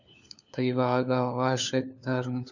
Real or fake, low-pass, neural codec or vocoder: fake; 7.2 kHz; codec, 16 kHz, 4 kbps, FunCodec, trained on LibriTTS, 50 frames a second